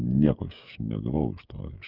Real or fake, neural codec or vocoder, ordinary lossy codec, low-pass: fake; codec, 16 kHz, 16 kbps, FreqCodec, smaller model; Opus, 24 kbps; 5.4 kHz